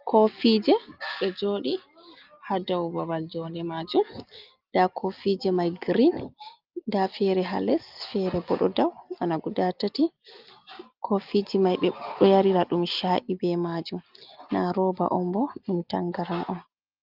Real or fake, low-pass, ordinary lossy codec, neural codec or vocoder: real; 5.4 kHz; Opus, 32 kbps; none